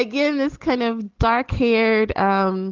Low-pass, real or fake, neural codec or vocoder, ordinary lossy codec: 7.2 kHz; real; none; Opus, 16 kbps